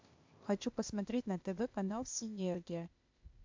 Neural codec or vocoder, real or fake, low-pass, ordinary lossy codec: codec, 16 kHz, 0.8 kbps, ZipCodec; fake; 7.2 kHz; MP3, 64 kbps